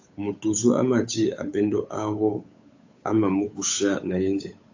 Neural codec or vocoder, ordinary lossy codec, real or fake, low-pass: codec, 16 kHz, 8 kbps, FunCodec, trained on Chinese and English, 25 frames a second; AAC, 48 kbps; fake; 7.2 kHz